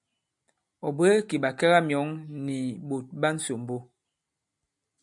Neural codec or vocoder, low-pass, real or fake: none; 10.8 kHz; real